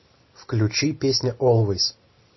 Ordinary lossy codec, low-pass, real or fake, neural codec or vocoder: MP3, 24 kbps; 7.2 kHz; real; none